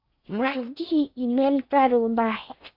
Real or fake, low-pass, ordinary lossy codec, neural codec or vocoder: fake; 5.4 kHz; AAC, 48 kbps; codec, 16 kHz in and 24 kHz out, 0.6 kbps, FocalCodec, streaming, 4096 codes